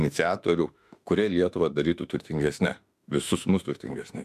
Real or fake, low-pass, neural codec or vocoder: fake; 14.4 kHz; autoencoder, 48 kHz, 32 numbers a frame, DAC-VAE, trained on Japanese speech